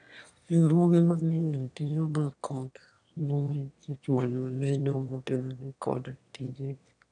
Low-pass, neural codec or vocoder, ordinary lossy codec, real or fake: 9.9 kHz; autoencoder, 22.05 kHz, a latent of 192 numbers a frame, VITS, trained on one speaker; none; fake